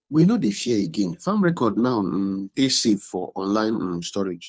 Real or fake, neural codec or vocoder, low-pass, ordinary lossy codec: fake; codec, 16 kHz, 2 kbps, FunCodec, trained on Chinese and English, 25 frames a second; none; none